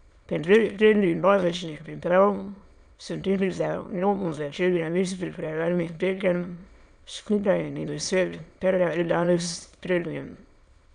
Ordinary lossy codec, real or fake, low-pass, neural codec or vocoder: none; fake; 9.9 kHz; autoencoder, 22.05 kHz, a latent of 192 numbers a frame, VITS, trained on many speakers